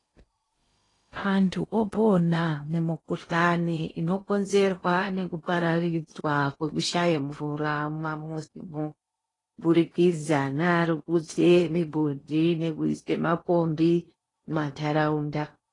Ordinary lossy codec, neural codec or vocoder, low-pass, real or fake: AAC, 32 kbps; codec, 16 kHz in and 24 kHz out, 0.8 kbps, FocalCodec, streaming, 65536 codes; 10.8 kHz; fake